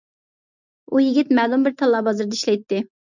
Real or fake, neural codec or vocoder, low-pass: real; none; 7.2 kHz